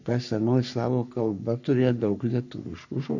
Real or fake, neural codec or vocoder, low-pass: fake; codec, 44.1 kHz, 3.4 kbps, Pupu-Codec; 7.2 kHz